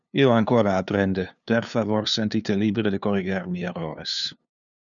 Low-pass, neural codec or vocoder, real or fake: 7.2 kHz; codec, 16 kHz, 2 kbps, FunCodec, trained on LibriTTS, 25 frames a second; fake